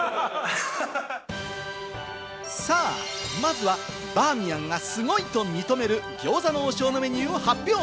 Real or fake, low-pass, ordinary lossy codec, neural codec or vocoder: real; none; none; none